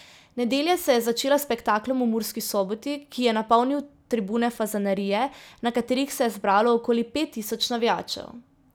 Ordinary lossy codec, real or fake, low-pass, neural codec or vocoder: none; real; none; none